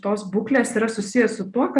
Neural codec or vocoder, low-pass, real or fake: none; 10.8 kHz; real